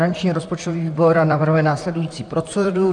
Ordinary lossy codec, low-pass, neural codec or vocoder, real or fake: AAC, 64 kbps; 10.8 kHz; vocoder, 44.1 kHz, 128 mel bands, Pupu-Vocoder; fake